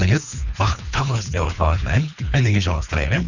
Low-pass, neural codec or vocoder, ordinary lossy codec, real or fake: 7.2 kHz; codec, 24 kHz, 3 kbps, HILCodec; none; fake